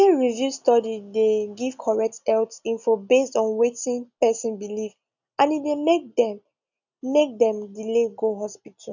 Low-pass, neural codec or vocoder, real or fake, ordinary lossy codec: 7.2 kHz; none; real; none